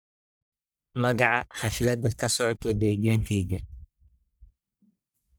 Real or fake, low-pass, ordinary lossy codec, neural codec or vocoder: fake; none; none; codec, 44.1 kHz, 1.7 kbps, Pupu-Codec